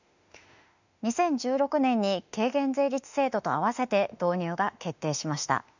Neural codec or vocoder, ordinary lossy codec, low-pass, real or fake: autoencoder, 48 kHz, 32 numbers a frame, DAC-VAE, trained on Japanese speech; none; 7.2 kHz; fake